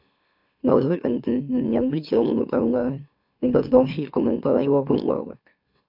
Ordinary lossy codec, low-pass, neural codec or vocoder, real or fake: AAC, 48 kbps; 5.4 kHz; autoencoder, 44.1 kHz, a latent of 192 numbers a frame, MeloTTS; fake